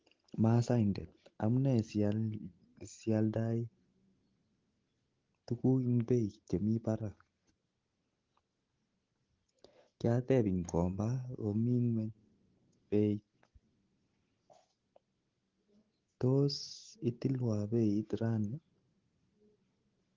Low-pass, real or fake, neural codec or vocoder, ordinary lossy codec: 7.2 kHz; real; none; Opus, 16 kbps